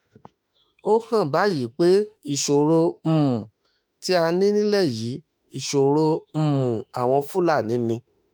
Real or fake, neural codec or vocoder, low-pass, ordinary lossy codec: fake; autoencoder, 48 kHz, 32 numbers a frame, DAC-VAE, trained on Japanese speech; none; none